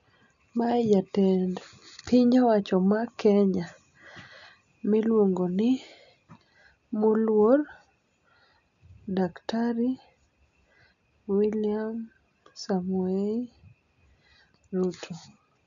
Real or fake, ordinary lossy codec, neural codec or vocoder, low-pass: real; none; none; 7.2 kHz